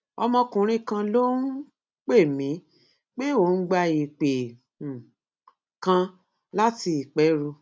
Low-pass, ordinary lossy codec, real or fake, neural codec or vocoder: none; none; real; none